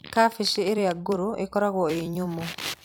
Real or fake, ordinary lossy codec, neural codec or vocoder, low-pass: fake; none; vocoder, 44.1 kHz, 128 mel bands every 256 samples, BigVGAN v2; none